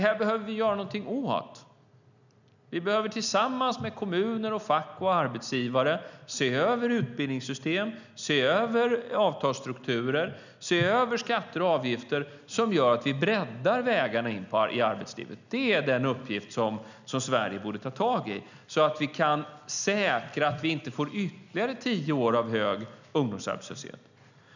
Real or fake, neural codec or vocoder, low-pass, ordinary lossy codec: real; none; 7.2 kHz; none